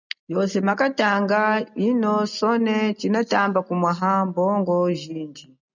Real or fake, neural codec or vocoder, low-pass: real; none; 7.2 kHz